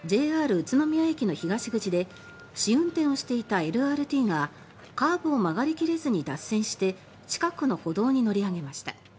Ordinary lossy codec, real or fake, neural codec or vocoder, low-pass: none; real; none; none